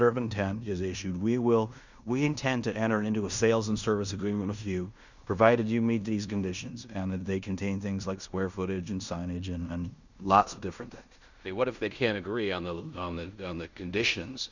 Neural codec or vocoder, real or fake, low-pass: codec, 16 kHz in and 24 kHz out, 0.9 kbps, LongCat-Audio-Codec, fine tuned four codebook decoder; fake; 7.2 kHz